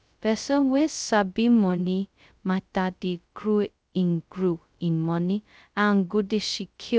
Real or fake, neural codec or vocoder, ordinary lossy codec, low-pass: fake; codec, 16 kHz, 0.2 kbps, FocalCodec; none; none